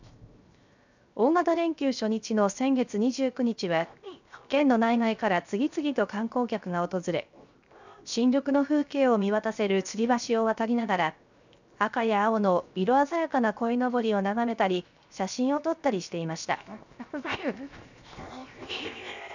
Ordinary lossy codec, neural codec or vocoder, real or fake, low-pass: none; codec, 16 kHz, 0.7 kbps, FocalCodec; fake; 7.2 kHz